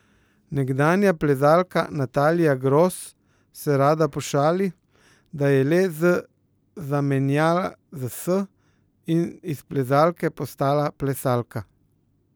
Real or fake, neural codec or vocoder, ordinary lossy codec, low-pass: real; none; none; none